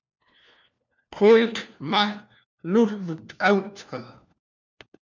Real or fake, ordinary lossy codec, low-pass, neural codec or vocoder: fake; MP3, 64 kbps; 7.2 kHz; codec, 16 kHz, 1 kbps, FunCodec, trained on LibriTTS, 50 frames a second